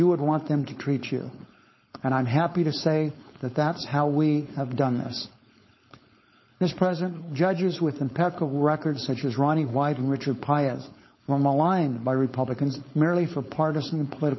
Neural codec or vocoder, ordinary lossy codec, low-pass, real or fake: codec, 16 kHz, 4.8 kbps, FACodec; MP3, 24 kbps; 7.2 kHz; fake